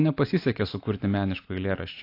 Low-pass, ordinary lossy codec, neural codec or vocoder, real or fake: 5.4 kHz; AAC, 32 kbps; none; real